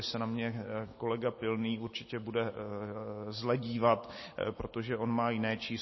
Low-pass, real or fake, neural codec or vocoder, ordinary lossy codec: 7.2 kHz; real; none; MP3, 24 kbps